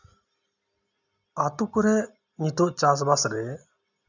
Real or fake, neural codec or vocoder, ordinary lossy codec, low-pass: real; none; none; 7.2 kHz